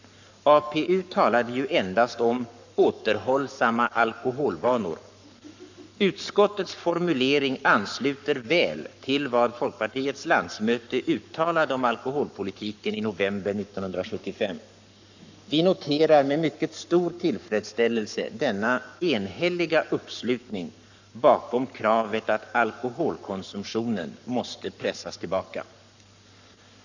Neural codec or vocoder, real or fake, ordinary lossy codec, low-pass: codec, 44.1 kHz, 7.8 kbps, Pupu-Codec; fake; none; 7.2 kHz